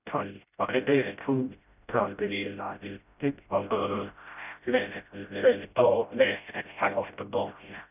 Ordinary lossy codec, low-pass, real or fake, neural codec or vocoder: none; 3.6 kHz; fake; codec, 16 kHz, 0.5 kbps, FreqCodec, smaller model